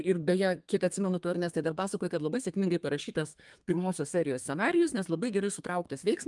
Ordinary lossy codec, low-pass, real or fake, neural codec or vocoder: Opus, 32 kbps; 10.8 kHz; fake; codec, 32 kHz, 1.9 kbps, SNAC